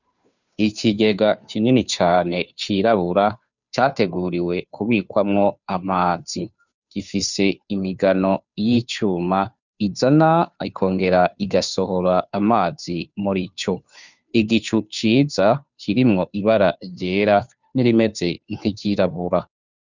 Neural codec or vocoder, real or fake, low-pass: codec, 16 kHz, 2 kbps, FunCodec, trained on Chinese and English, 25 frames a second; fake; 7.2 kHz